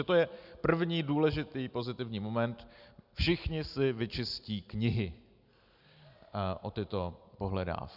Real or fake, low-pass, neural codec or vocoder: real; 5.4 kHz; none